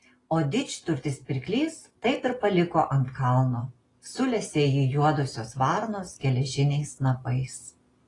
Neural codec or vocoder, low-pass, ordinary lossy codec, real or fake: none; 10.8 kHz; AAC, 32 kbps; real